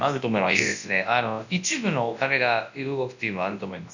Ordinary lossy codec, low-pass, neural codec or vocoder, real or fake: none; 7.2 kHz; codec, 24 kHz, 0.9 kbps, WavTokenizer, large speech release; fake